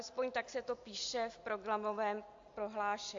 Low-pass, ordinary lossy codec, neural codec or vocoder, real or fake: 7.2 kHz; AAC, 48 kbps; none; real